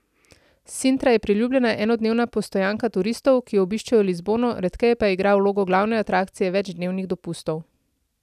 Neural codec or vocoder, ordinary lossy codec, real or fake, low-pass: none; none; real; 14.4 kHz